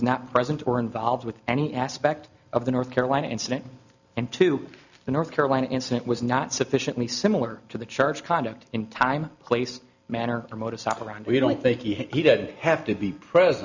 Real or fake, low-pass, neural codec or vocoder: real; 7.2 kHz; none